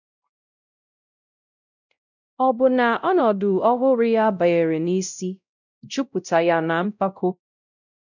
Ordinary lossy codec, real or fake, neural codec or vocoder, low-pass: none; fake; codec, 16 kHz, 0.5 kbps, X-Codec, WavLM features, trained on Multilingual LibriSpeech; 7.2 kHz